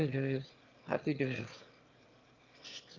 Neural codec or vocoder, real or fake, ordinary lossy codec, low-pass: autoencoder, 22.05 kHz, a latent of 192 numbers a frame, VITS, trained on one speaker; fake; Opus, 24 kbps; 7.2 kHz